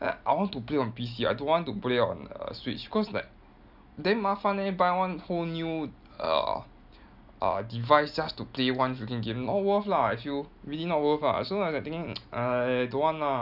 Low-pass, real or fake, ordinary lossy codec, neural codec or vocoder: 5.4 kHz; real; none; none